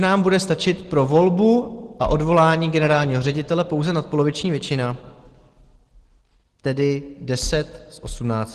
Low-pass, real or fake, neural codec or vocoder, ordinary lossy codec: 10.8 kHz; real; none; Opus, 16 kbps